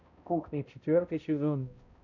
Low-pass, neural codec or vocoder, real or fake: 7.2 kHz; codec, 16 kHz, 0.5 kbps, X-Codec, HuBERT features, trained on balanced general audio; fake